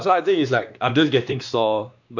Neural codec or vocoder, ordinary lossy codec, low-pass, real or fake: codec, 16 kHz, 2 kbps, X-Codec, WavLM features, trained on Multilingual LibriSpeech; none; 7.2 kHz; fake